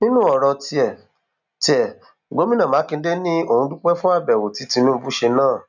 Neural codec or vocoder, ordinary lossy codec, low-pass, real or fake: none; none; 7.2 kHz; real